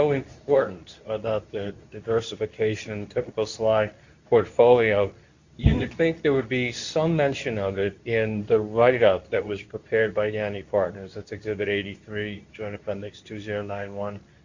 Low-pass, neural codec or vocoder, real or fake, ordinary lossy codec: 7.2 kHz; codec, 24 kHz, 0.9 kbps, WavTokenizer, medium speech release version 2; fake; Opus, 64 kbps